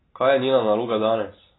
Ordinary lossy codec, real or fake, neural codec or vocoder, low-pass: AAC, 16 kbps; real; none; 7.2 kHz